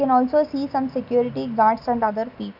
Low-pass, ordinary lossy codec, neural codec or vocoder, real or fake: 5.4 kHz; none; none; real